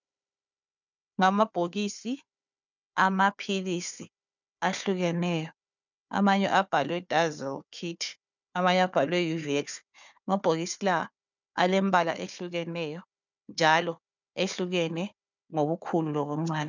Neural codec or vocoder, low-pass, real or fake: codec, 16 kHz, 4 kbps, FunCodec, trained on Chinese and English, 50 frames a second; 7.2 kHz; fake